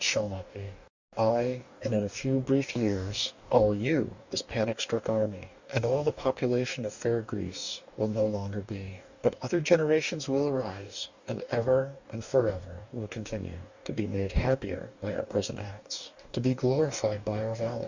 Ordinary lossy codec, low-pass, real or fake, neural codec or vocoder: Opus, 64 kbps; 7.2 kHz; fake; codec, 44.1 kHz, 2.6 kbps, DAC